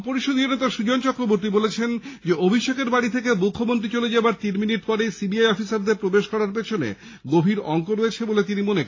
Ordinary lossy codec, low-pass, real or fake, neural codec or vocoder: AAC, 32 kbps; 7.2 kHz; real; none